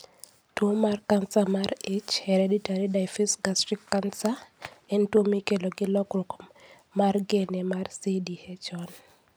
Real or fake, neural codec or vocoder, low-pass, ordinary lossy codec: real; none; none; none